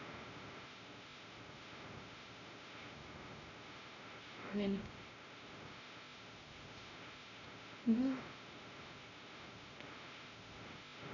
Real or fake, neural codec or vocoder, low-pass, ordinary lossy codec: fake; codec, 16 kHz, 0.5 kbps, X-Codec, WavLM features, trained on Multilingual LibriSpeech; 7.2 kHz; none